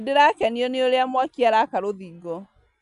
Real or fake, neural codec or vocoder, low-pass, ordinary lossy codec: real; none; 10.8 kHz; none